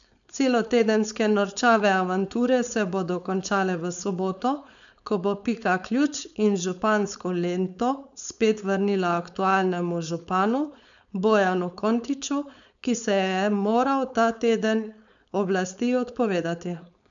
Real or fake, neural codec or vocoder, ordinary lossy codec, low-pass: fake; codec, 16 kHz, 4.8 kbps, FACodec; none; 7.2 kHz